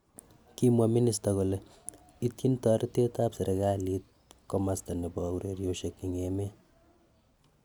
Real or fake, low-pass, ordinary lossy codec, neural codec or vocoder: real; none; none; none